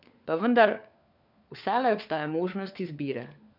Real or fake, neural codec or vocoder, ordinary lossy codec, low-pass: fake; codec, 16 kHz, 4 kbps, FunCodec, trained on LibriTTS, 50 frames a second; none; 5.4 kHz